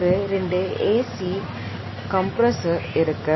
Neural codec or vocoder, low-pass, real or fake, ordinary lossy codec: none; 7.2 kHz; real; MP3, 24 kbps